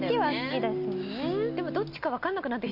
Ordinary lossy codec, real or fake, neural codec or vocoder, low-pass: Opus, 64 kbps; real; none; 5.4 kHz